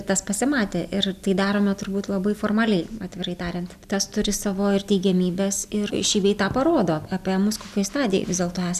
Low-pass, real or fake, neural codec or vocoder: 14.4 kHz; real; none